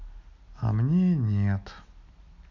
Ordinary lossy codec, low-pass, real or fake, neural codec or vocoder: none; 7.2 kHz; real; none